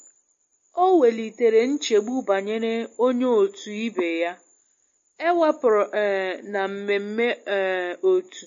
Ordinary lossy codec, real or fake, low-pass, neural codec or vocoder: MP3, 32 kbps; real; 7.2 kHz; none